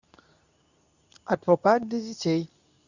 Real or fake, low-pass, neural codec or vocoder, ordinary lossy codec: fake; 7.2 kHz; codec, 24 kHz, 0.9 kbps, WavTokenizer, medium speech release version 2; none